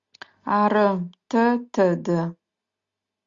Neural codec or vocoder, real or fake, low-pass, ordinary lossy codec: none; real; 7.2 kHz; Opus, 64 kbps